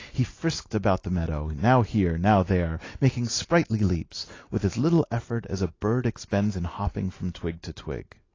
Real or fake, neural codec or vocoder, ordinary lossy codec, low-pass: real; none; AAC, 32 kbps; 7.2 kHz